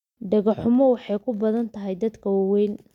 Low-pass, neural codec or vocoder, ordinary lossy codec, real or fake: 19.8 kHz; none; none; real